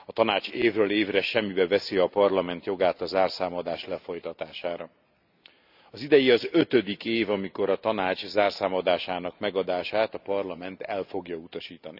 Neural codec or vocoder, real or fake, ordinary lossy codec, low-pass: none; real; none; 5.4 kHz